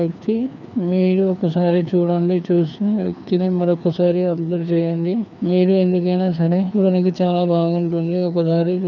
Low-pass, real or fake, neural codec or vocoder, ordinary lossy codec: 7.2 kHz; fake; codec, 16 kHz, 2 kbps, FreqCodec, larger model; none